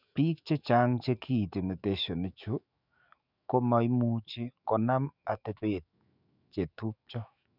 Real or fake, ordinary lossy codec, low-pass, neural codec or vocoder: fake; none; 5.4 kHz; autoencoder, 48 kHz, 128 numbers a frame, DAC-VAE, trained on Japanese speech